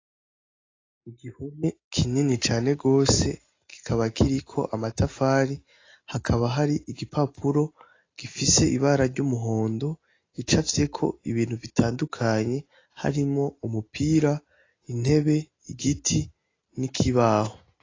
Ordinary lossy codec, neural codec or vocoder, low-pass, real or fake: AAC, 32 kbps; none; 7.2 kHz; real